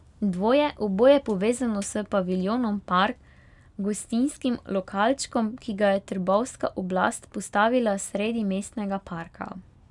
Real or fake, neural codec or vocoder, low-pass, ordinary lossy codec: real; none; 10.8 kHz; none